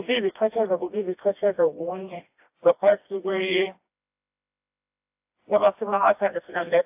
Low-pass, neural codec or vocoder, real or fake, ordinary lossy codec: 3.6 kHz; codec, 16 kHz, 1 kbps, FreqCodec, smaller model; fake; AAC, 32 kbps